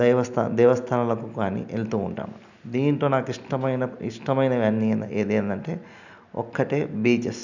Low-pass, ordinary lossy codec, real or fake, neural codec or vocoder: 7.2 kHz; none; real; none